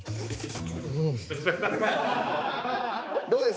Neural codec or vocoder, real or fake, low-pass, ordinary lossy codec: codec, 16 kHz, 4 kbps, X-Codec, HuBERT features, trained on balanced general audio; fake; none; none